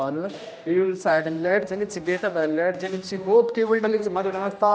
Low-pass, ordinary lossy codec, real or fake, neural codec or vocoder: none; none; fake; codec, 16 kHz, 1 kbps, X-Codec, HuBERT features, trained on general audio